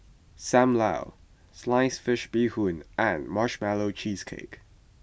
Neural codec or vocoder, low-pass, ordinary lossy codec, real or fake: none; none; none; real